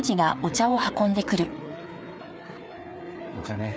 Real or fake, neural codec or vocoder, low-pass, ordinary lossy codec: fake; codec, 16 kHz, 4 kbps, FreqCodec, larger model; none; none